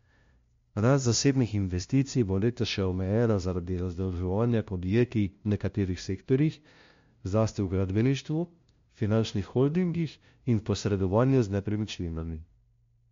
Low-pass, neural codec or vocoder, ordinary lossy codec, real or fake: 7.2 kHz; codec, 16 kHz, 0.5 kbps, FunCodec, trained on LibriTTS, 25 frames a second; MP3, 48 kbps; fake